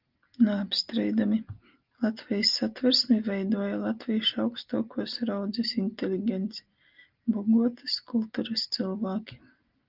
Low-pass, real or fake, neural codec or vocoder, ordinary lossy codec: 5.4 kHz; real; none; Opus, 32 kbps